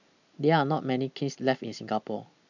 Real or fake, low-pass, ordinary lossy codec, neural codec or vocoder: real; 7.2 kHz; none; none